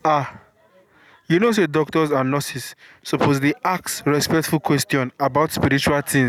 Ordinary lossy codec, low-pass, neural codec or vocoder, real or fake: none; 19.8 kHz; none; real